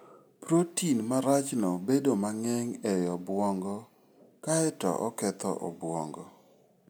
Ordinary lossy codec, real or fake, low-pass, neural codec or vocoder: none; real; none; none